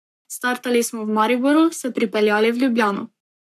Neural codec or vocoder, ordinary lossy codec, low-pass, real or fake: codec, 44.1 kHz, 7.8 kbps, Pupu-Codec; AAC, 96 kbps; 14.4 kHz; fake